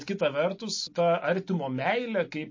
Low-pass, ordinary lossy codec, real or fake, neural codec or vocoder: 7.2 kHz; MP3, 32 kbps; real; none